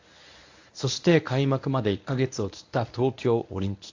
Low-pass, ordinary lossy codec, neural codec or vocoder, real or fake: 7.2 kHz; AAC, 48 kbps; codec, 24 kHz, 0.9 kbps, WavTokenizer, medium speech release version 1; fake